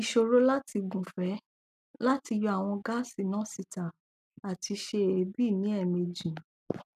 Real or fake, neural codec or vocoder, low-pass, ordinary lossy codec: real; none; 14.4 kHz; none